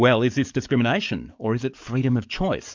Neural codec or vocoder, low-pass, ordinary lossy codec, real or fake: codec, 44.1 kHz, 7.8 kbps, DAC; 7.2 kHz; MP3, 64 kbps; fake